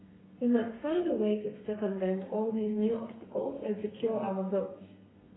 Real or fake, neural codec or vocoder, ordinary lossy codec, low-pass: fake; codec, 32 kHz, 1.9 kbps, SNAC; AAC, 16 kbps; 7.2 kHz